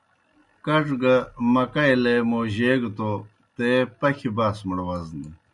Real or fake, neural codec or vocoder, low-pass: real; none; 10.8 kHz